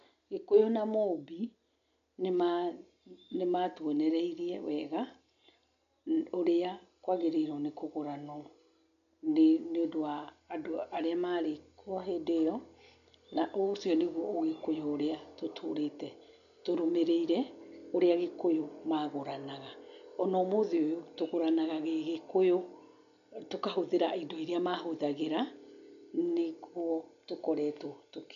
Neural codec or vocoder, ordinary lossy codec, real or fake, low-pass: none; none; real; 7.2 kHz